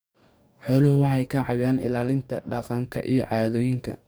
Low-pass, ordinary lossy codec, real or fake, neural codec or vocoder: none; none; fake; codec, 44.1 kHz, 2.6 kbps, DAC